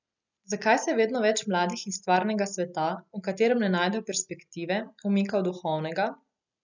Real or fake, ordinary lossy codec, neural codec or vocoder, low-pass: real; none; none; 7.2 kHz